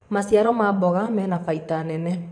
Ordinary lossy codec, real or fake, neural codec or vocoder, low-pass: none; fake; vocoder, 22.05 kHz, 80 mel bands, Vocos; 9.9 kHz